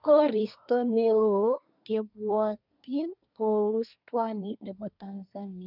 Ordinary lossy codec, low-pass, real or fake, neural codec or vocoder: none; 5.4 kHz; fake; codec, 24 kHz, 1 kbps, SNAC